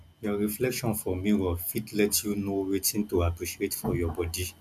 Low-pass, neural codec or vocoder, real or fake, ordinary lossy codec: 14.4 kHz; none; real; none